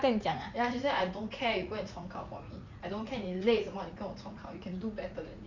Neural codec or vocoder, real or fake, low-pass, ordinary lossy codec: vocoder, 44.1 kHz, 80 mel bands, Vocos; fake; 7.2 kHz; none